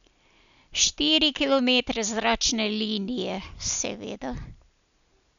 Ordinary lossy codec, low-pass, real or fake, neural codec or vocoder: none; 7.2 kHz; real; none